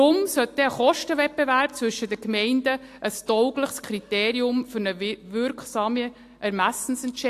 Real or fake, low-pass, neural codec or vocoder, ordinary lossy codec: real; 14.4 kHz; none; AAC, 64 kbps